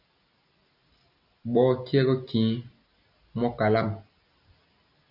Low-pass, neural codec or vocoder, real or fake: 5.4 kHz; none; real